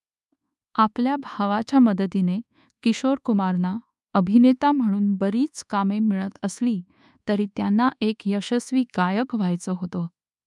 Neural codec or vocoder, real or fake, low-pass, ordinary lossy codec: codec, 24 kHz, 1.2 kbps, DualCodec; fake; none; none